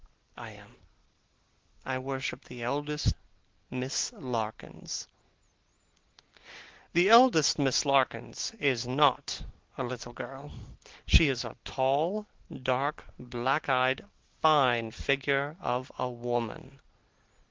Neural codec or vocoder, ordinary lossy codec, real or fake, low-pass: none; Opus, 16 kbps; real; 7.2 kHz